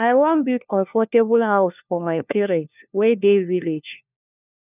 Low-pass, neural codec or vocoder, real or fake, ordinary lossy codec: 3.6 kHz; codec, 16 kHz, 1 kbps, FunCodec, trained on LibriTTS, 50 frames a second; fake; none